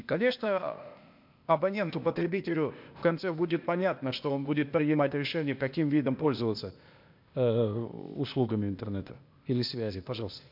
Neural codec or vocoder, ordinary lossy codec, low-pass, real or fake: codec, 16 kHz, 0.8 kbps, ZipCodec; MP3, 48 kbps; 5.4 kHz; fake